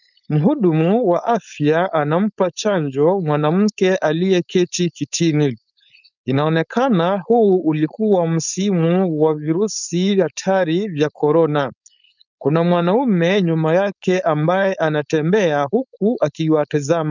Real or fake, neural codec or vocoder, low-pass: fake; codec, 16 kHz, 4.8 kbps, FACodec; 7.2 kHz